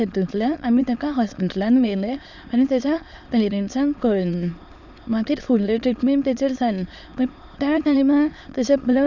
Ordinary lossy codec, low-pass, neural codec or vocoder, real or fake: none; 7.2 kHz; autoencoder, 22.05 kHz, a latent of 192 numbers a frame, VITS, trained on many speakers; fake